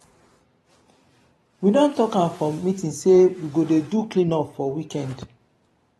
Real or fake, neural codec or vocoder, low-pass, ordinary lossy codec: fake; vocoder, 44.1 kHz, 128 mel bands every 512 samples, BigVGAN v2; 19.8 kHz; AAC, 32 kbps